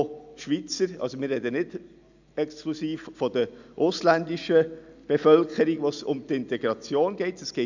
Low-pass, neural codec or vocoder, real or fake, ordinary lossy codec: 7.2 kHz; none; real; none